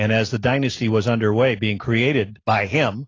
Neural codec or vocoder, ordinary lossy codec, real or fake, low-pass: none; AAC, 32 kbps; real; 7.2 kHz